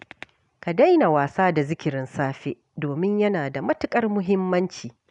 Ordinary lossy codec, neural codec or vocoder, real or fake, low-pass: none; none; real; 10.8 kHz